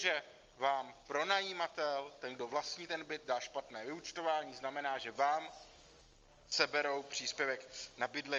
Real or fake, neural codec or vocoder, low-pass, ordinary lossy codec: real; none; 7.2 kHz; Opus, 32 kbps